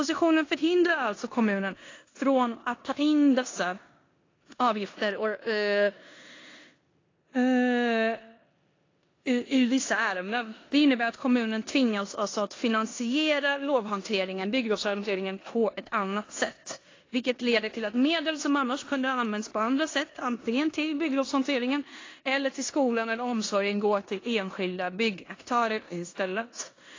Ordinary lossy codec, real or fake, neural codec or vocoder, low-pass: AAC, 32 kbps; fake; codec, 16 kHz in and 24 kHz out, 0.9 kbps, LongCat-Audio-Codec, four codebook decoder; 7.2 kHz